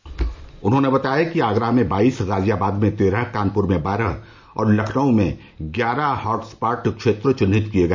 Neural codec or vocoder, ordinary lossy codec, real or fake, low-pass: none; MP3, 64 kbps; real; 7.2 kHz